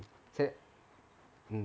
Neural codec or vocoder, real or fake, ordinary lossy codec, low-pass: none; real; none; none